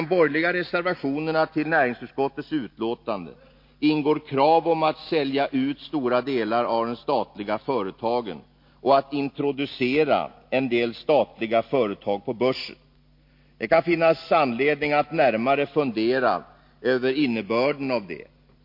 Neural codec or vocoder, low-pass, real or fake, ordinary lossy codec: none; 5.4 kHz; real; MP3, 32 kbps